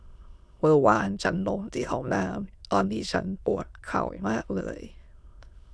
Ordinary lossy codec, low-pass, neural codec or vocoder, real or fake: none; none; autoencoder, 22.05 kHz, a latent of 192 numbers a frame, VITS, trained on many speakers; fake